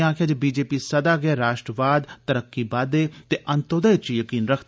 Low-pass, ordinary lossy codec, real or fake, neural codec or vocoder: none; none; real; none